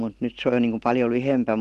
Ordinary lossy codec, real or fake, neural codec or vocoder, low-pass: Opus, 16 kbps; real; none; 10.8 kHz